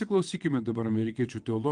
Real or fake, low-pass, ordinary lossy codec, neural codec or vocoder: fake; 9.9 kHz; Opus, 24 kbps; vocoder, 22.05 kHz, 80 mel bands, WaveNeXt